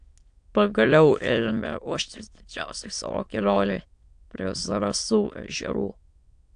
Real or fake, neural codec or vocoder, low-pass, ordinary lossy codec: fake; autoencoder, 22.05 kHz, a latent of 192 numbers a frame, VITS, trained on many speakers; 9.9 kHz; AAC, 64 kbps